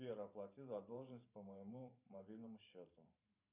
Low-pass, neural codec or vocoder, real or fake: 3.6 kHz; vocoder, 44.1 kHz, 128 mel bands every 512 samples, BigVGAN v2; fake